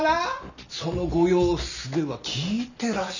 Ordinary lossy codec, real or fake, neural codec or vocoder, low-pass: none; real; none; 7.2 kHz